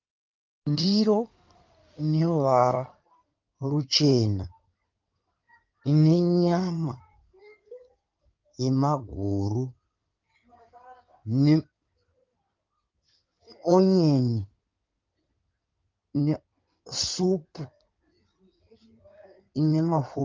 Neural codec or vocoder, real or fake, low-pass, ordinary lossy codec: codec, 16 kHz in and 24 kHz out, 2.2 kbps, FireRedTTS-2 codec; fake; 7.2 kHz; Opus, 24 kbps